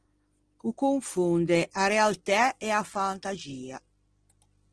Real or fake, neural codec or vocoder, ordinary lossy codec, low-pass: real; none; Opus, 16 kbps; 9.9 kHz